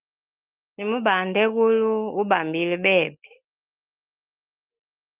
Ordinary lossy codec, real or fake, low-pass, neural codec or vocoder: Opus, 24 kbps; real; 3.6 kHz; none